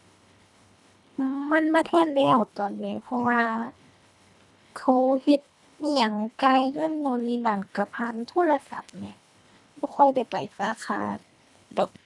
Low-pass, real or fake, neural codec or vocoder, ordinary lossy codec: none; fake; codec, 24 kHz, 1.5 kbps, HILCodec; none